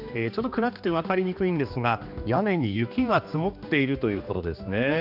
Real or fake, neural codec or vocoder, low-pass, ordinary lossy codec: fake; codec, 16 kHz, 2 kbps, X-Codec, HuBERT features, trained on general audio; 5.4 kHz; none